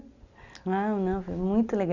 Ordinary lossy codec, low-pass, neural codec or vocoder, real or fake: none; 7.2 kHz; none; real